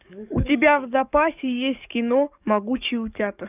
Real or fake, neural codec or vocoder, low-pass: fake; codec, 44.1 kHz, 7.8 kbps, DAC; 3.6 kHz